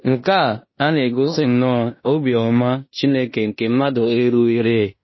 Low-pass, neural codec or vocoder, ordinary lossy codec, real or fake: 7.2 kHz; codec, 16 kHz in and 24 kHz out, 0.9 kbps, LongCat-Audio-Codec, fine tuned four codebook decoder; MP3, 24 kbps; fake